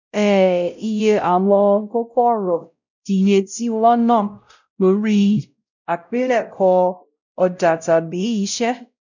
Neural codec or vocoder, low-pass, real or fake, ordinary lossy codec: codec, 16 kHz, 0.5 kbps, X-Codec, WavLM features, trained on Multilingual LibriSpeech; 7.2 kHz; fake; none